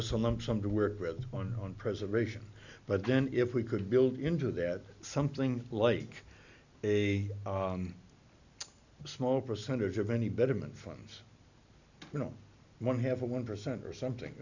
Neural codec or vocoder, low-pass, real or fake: none; 7.2 kHz; real